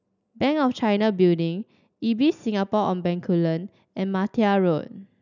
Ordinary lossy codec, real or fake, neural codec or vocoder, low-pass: none; real; none; 7.2 kHz